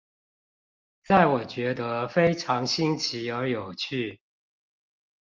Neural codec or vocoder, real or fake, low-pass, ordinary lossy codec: none; real; 7.2 kHz; Opus, 24 kbps